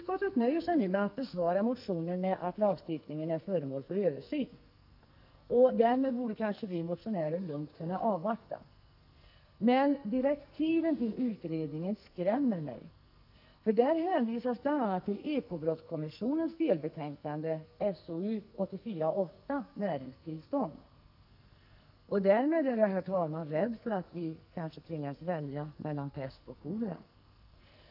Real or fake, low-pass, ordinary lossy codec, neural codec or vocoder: fake; 5.4 kHz; none; codec, 44.1 kHz, 2.6 kbps, SNAC